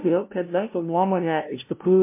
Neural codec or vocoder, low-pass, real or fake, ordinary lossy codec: codec, 16 kHz, 0.5 kbps, FunCodec, trained on LibriTTS, 25 frames a second; 3.6 kHz; fake; MP3, 24 kbps